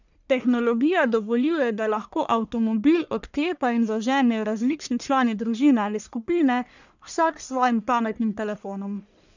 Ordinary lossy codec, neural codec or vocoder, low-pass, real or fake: none; codec, 44.1 kHz, 1.7 kbps, Pupu-Codec; 7.2 kHz; fake